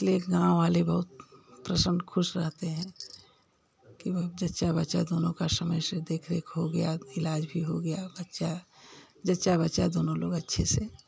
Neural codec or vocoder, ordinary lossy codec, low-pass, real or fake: none; none; none; real